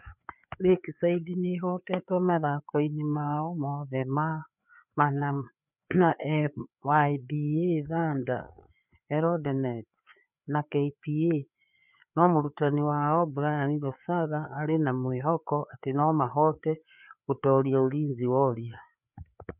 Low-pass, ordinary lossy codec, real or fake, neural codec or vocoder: 3.6 kHz; none; fake; codec, 16 kHz, 4 kbps, FreqCodec, larger model